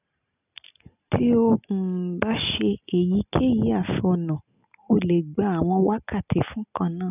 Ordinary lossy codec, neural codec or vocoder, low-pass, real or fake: none; none; 3.6 kHz; real